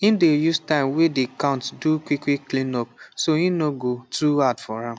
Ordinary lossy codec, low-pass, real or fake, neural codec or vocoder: none; none; real; none